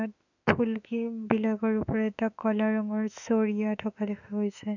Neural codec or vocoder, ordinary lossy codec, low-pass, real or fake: autoencoder, 48 kHz, 32 numbers a frame, DAC-VAE, trained on Japanese speech; none; 7.2 kHz; fake